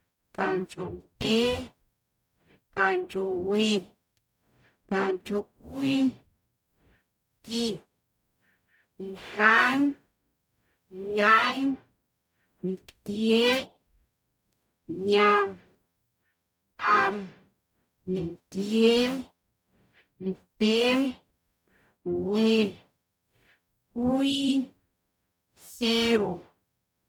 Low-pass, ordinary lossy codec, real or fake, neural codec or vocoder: 19.8 kHz; none; fake; codec, 44.1 kHz, 0.9 kbps, DAC